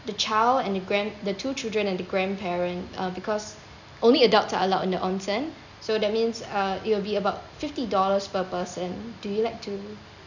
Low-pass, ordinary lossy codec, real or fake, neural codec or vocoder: 7.2 kHz; none; real; none